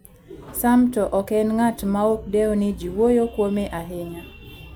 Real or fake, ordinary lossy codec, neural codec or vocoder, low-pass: real; none; none; none